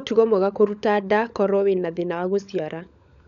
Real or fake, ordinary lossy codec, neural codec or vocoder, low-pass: fake; none; codec, 16 kHz, 16 kbps, FunCodec, trained on LibriTTS, 50 frames a second; 7.2 kHz